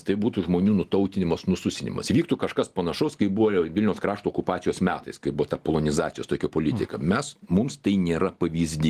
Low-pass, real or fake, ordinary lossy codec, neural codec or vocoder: 14.4 kHz; real; Opus, 24 kbps; none